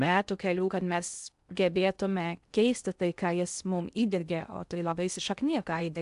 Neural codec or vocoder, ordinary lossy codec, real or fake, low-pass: codec, 16 kHz in and 24 kHz out, 0.6 kbps, FocalCodec, streaming, 2048 codes; AAC, 96 kbps; fake; 10.8 kHz